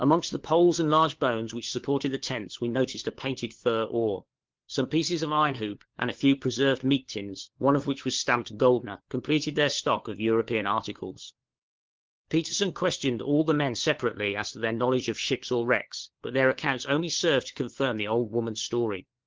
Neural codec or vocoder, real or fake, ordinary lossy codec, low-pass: codec, 16 kHz, 4 kbps, FunCodec, trained on LibriTTS, 50 frames a second; fake; Opus, 16 kbps; 7.2 kHz